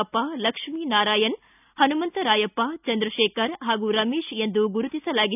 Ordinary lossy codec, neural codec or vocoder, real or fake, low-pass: none; none; real; 3.6 kHz